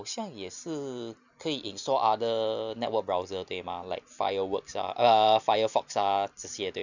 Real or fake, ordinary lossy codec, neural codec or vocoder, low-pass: real; none; none; 7.2 kHz